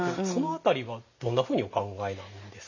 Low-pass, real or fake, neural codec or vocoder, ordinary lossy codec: 7.2 kHz; real; none; AAC, 48 kbps